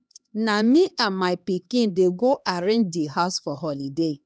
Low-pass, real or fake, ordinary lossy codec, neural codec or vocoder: none; fake; none; codec, 16 kHz, 2 kbps, X-Codec, HuBERT features, trained on LibriSpeech